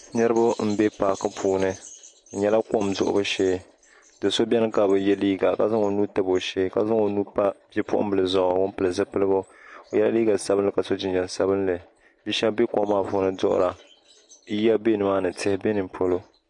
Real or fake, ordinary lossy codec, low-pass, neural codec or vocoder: real; MP3, 48 kbps; 10.8 kHz; none